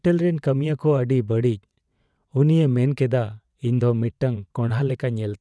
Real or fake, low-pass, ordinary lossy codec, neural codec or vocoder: fake; none; none; vocoder, 22.05 kHz, 80 mel bands, WaveNeXt